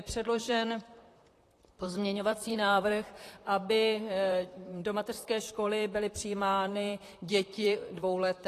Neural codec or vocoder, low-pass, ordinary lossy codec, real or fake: vocoder, 44.1 kHz, 128 mel bands, Pupu-Vocoder; 14.4 kHz; AAC, 48 kbps; fake